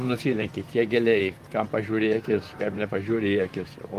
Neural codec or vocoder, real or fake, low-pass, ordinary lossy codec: vocoder, 44.1 kHz, 128 mel bands, Pupu-Vocoder; fake; 14.4 kHz; Opus, 32 kbps